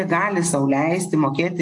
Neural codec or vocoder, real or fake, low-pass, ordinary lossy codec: none; real; 10.8 kHz; AAC, 64 kbps